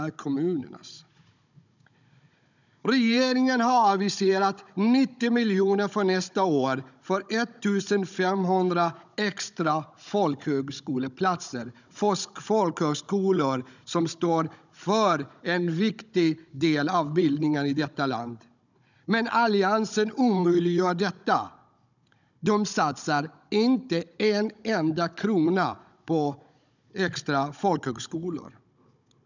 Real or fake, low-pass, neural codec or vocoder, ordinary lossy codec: fake; 7.2 kHz; codec, 16 kHz, 16 kbps, FunCodec, trained on Chinese and English, 50 frames a second; none